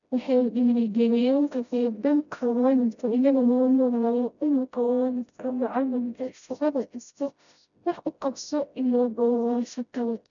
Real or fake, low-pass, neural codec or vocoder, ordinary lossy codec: fake; 7.2 kHz; codec, 16 kHz, 0.5 kbps, FreqCodec, smaller model; MP3, 96 kbps